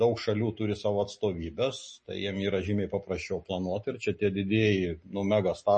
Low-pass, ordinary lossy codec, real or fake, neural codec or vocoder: 10.8 kHz; MP3, 32 kbps; real; none